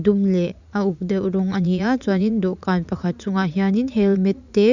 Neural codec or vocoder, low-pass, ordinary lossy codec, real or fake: vocoder, 44.1 kHz, 80 mel bands, Vocos; 7.2 kHz; none; fake